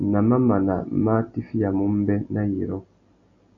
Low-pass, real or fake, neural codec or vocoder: 7.2 kHz; real; none